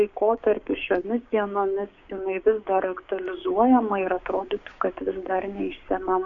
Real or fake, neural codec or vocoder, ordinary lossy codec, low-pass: fake; codec, 16 kHz, 6 kbps, DAC; MP3, 96 kbps; 7.2 kHz